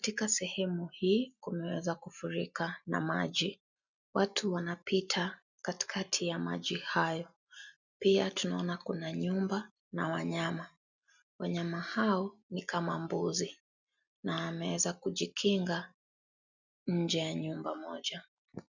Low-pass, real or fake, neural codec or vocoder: 7.2 kHz; real; none